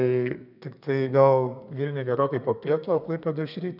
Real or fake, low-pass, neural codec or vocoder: fake; 5.4 kHz; codec, 32 kHz, 1.9 kbps, SNAC